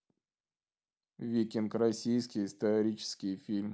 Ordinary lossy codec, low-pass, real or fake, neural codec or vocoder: none; none; real; none